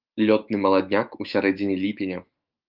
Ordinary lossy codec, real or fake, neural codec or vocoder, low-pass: Opus, 24 kbps; fake; autoencoder, 48 kHz, 128 numbers a frame, DAC-VAE, trained on Japanese speech; 5.4 kHz